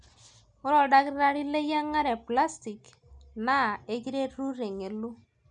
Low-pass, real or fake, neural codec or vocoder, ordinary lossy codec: 9.9 kHz; real; none; none